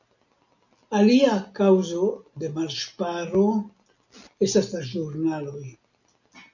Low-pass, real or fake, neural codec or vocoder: 7.2 kHz; real; none